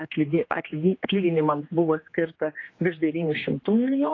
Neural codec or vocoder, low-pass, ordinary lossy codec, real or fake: codec, 16 kHz, 2 kbps, X-Codec, HuBERT features, trained on general audio; 7.2 kHz; AAC, 32 kbps; fake